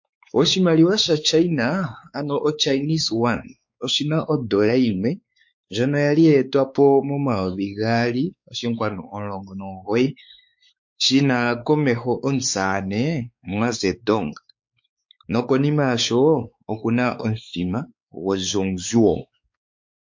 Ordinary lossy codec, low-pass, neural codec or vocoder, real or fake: MP3, 48 kbps; 7.2 kHz; codec, 16 kHz, 4 kbps, X-Codec, WavLM features, trained on Multilingual LibriSpeech; fake